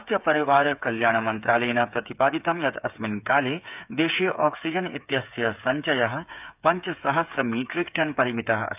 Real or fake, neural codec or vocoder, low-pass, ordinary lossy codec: fake; codec, 16 kHz, 8 kbps, FreqCodec, smaller model; 3.6 kHz; none